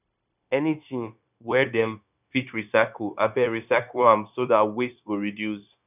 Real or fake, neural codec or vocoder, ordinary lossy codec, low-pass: fake; codec, 16 kHz, 0.9 kbps, LongCat-Audio-Codec; none; 3.6 kHz